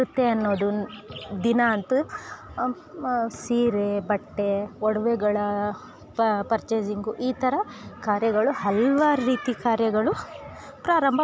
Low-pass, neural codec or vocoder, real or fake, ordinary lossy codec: none; none; real; none